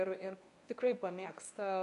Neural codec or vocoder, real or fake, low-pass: codec, 24 kHz, 0.9 kbps, WavTokenizer, medium speech release version 1; fake; 10.8 kHz